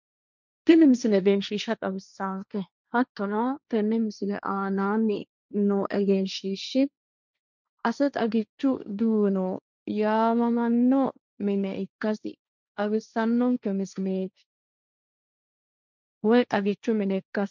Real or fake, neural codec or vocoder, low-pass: fake; codec, 16 kHz, 1.1 kbps, Voila-Tokenizer; 7.2 kHz